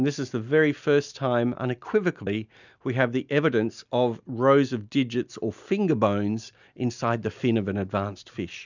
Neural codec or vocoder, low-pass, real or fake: none; 7.2 kHz; real